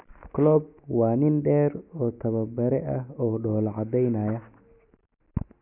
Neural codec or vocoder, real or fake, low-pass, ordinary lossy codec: none; real; 3.6 kHz; none